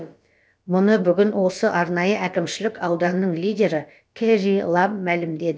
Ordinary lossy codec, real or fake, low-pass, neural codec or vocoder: none; fake; none; codec, 16 kHz, about 1 kbps, DyCAST, with the encoder's durations